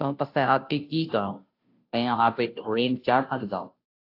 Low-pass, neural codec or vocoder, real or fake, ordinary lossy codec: 5.4 kHz; codec, 16 kHz, 0.5 kbps, FunCodec, trained on Chinese and English, 25 frames a second; fake; AAC, 32 kbps